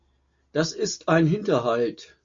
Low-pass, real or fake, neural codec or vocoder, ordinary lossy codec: 7.2 kHz; real; none; MP3, 48 kbps